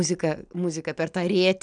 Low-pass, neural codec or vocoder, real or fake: 9.9 kHz; none; real